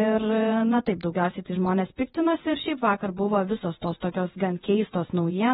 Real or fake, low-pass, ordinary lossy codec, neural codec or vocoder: fake; 19.8 kHz; AAC, 16 kbps; vocoder, 48 kHz, 128 mel bands, Vocos